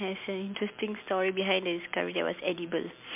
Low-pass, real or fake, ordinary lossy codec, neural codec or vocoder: 3.6 kHz; real; MP3, 32 kbps; none